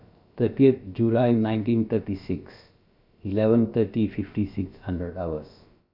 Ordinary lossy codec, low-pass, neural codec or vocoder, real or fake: Opus, 64 kbps; 5.4 kHz; codec, 16 kHz, about 1 kbps, DyCAST, with the encoder's durations; fake